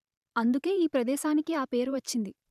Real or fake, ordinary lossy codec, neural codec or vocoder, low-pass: fake; none; vocoder, 44.1 kHz, 128 mel bands every 512 samples, BigVGAN v2; 14.4 kHz